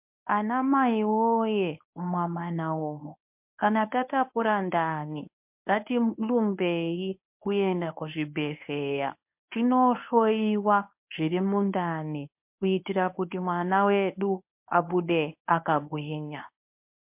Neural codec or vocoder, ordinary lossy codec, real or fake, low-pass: codec, 24 kHz, 0.9 kbps, WavTokenizer, medium speech release version 1; MP3, 32 kbps; fake; 3.6 kHz